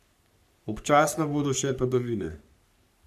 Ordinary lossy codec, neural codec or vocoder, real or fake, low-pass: none; codec, 44.1 kHz, 3.4 kbps, Pupu-Codec; fake; 14.4 kHz